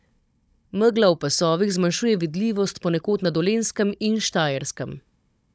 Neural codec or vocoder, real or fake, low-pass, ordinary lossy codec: codec, 16 kHz, 16 kbps, FunCodec, trained on Chinese and English, 50 frames a second; fake; none; none